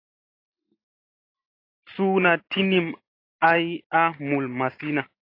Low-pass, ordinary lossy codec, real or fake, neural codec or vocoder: 5.4 kHz; AAC, 32 kbps; real; none